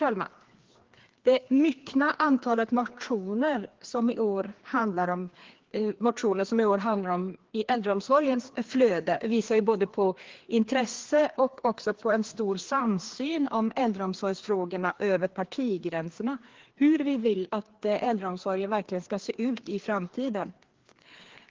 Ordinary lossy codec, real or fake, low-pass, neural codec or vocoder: Opus, 16 kbps; fake; 7.2 kHz; codec, 16 kHz, 2 kbps, FreqCodec, larger model